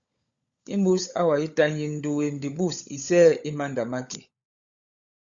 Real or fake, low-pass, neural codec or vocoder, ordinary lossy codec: fake; 7.2 kHz; codec, 16 kHz, 16 kbps, FunCodec, trained on LibriTTS, 50 frames a second; Opus, 64 kbps